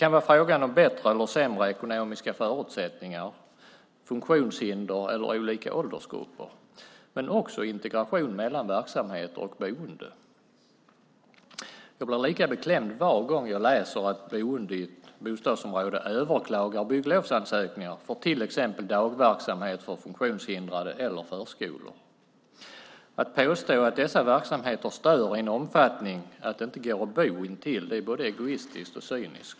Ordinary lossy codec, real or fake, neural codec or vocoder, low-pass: none; real; none; none